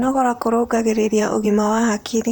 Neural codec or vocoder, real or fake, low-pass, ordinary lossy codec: vocoder, 44.1 kHz, 128 mel bands, Pupu-Vocoder; fake; none; none